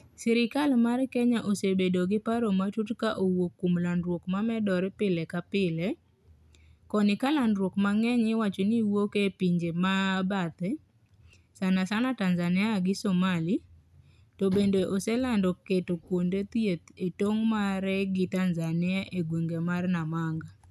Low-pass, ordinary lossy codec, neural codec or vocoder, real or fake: 14.4 kHz; none; none; real